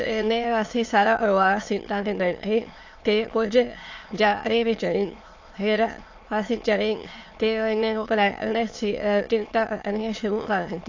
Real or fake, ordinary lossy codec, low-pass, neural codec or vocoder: fake; AAC, 48 kbps; 7.2 kHz; autoencoder, 22.05 kHz, a latent of 192 numbers a frame, VITS, trained on many speakers